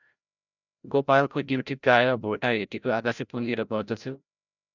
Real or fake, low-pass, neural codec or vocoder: fake; 7.2 kHz; codec, 16 kHz, 0.5 kbps, FreqCodec, larger model